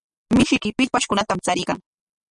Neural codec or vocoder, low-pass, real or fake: none; 10.8 kHz; real